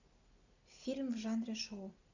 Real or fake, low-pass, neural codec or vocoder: real; 7.2 kHz; none